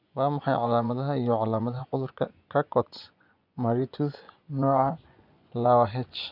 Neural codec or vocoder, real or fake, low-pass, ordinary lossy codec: vocoder, 24 kHz, 100 mel bands, Vocos; fake; 5.4 kHz; none